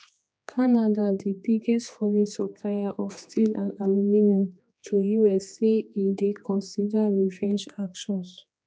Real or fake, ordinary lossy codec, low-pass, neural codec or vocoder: fake; none; none; codec, 16 kHz, 2 kbps, X-Codec, HuBERT features, trained on general audio